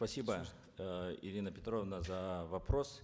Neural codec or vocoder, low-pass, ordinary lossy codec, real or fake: none; none; none; real